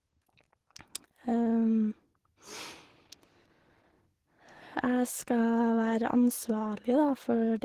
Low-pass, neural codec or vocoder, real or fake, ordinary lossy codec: 14.4 kHz; codec, 44.1 kHz, 7.8 kbps, DAC; fake; Opus, 16 kbps